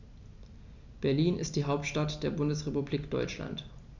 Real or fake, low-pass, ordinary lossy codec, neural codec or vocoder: real; 7.2 kHz; none; none